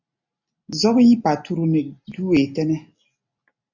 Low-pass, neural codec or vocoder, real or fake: 7.2 kHz; none; real